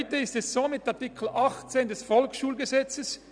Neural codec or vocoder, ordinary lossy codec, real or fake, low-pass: none; none; real; 9.9 kHz